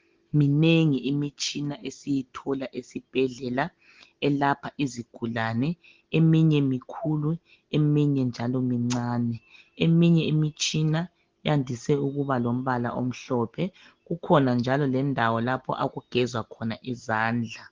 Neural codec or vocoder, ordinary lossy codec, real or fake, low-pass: none; Opus, 16 kbps; real; 7.2 kHz